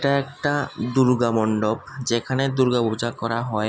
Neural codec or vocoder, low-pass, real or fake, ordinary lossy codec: none; none; real; none